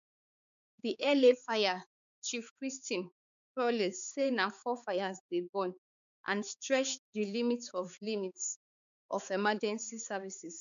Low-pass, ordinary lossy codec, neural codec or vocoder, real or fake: 7.2 kHz; none; codec, 16 kHz, 4 kbps, X-Codec, HuBERT features, trained on balanced general audio; fake